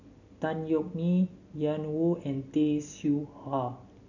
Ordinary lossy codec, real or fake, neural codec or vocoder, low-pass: none; real; none; 7.2 kHz